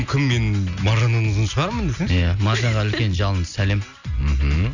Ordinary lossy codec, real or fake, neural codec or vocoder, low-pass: none; real; none; 7.2 kHz